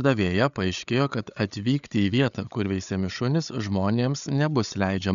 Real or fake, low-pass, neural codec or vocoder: fake; 7.2 kHz; codec, 16 kHz, 8 kbps, FreqCodec, larger model